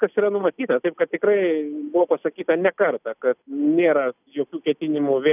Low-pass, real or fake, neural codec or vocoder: 3.6 kHz; real; none